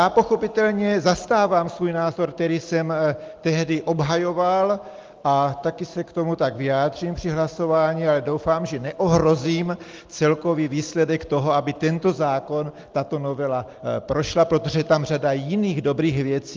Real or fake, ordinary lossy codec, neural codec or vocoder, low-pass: real; Opus, 32 kbps; none; 7.2 kHz